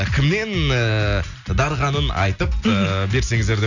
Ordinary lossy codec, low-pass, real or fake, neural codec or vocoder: none; 7.2 kHz; real; none